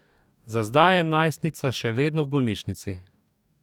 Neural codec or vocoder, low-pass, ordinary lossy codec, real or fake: codec, 44.1 kHz, 2.6 kbps, DAC; 19.8 kHz; none; fake